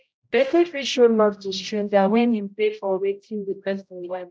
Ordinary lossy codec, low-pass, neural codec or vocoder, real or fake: none; none; codec, 16 kHz, 0.5 kbps, X-Codec, HuBERT features, trained on general audio; fake